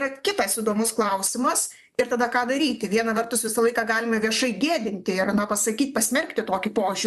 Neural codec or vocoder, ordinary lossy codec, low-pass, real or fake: vocoder, 44.1 kHz, 128 mel bands, Pupu-Vocoder; MP3, 96 kbps; 14.4 kHz; fake